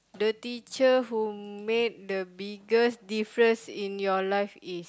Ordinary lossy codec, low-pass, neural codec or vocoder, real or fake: none; none; none; real